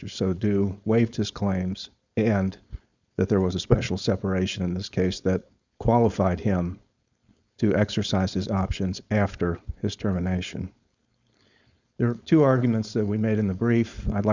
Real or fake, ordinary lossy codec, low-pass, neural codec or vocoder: fake; Opus, 64 kbps; 7.2 kHz; codec, 16 kHz, 4.8 kbps, FACodec